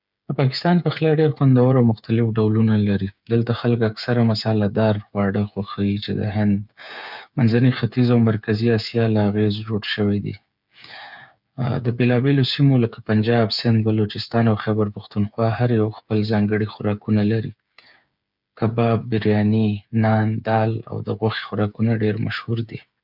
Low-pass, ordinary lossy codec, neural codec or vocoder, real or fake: 5.4 kHz; none; codec, 16 kHz, 8 kbps, FreqCodec, smaller model; fake